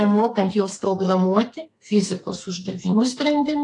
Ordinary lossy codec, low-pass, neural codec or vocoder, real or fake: AAC, 48 kbps; 10.8 kHz; codec, 32 kHz, 1.9 kbps, SNAC; fake